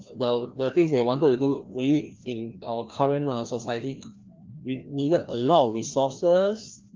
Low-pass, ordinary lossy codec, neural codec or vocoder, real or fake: 7.2 kHz; Opus, 32 kbps; codec, 16 kHz, 1 kbps, FreqCodec, larger model; fake